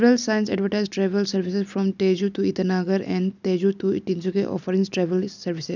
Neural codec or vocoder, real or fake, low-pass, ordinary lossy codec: none; real; 7.2 kHz; none